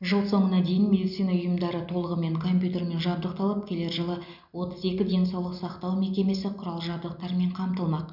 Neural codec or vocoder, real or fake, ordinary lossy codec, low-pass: none; real; none; 5.4 kHz